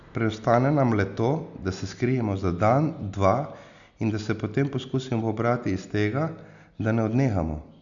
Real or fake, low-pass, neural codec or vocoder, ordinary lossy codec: real; 7.2 kHz; none; none